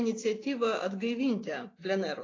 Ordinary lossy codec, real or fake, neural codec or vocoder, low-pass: AAC, 32 kbps; real; none; 7.2 kHz